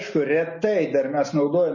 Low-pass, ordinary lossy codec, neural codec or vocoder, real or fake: 7.2 kHz; MP3, 32 kbps; none; real